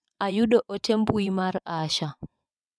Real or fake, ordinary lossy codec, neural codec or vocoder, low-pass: fake; none; vocoder, 22.05 kHz, 80 mel bands, WaveNeXt; none